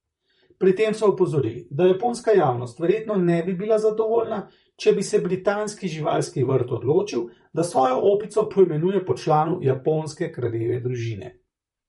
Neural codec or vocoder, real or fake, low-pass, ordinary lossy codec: vocoder, 44.1 kHz, 128 mel bands, Pupu-Vocoder; fake; 19.8 kHz; MP3, 48 kbps